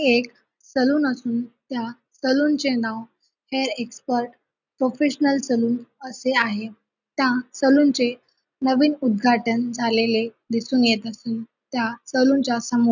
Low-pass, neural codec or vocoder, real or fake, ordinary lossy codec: 7.2 kHz; none; real; none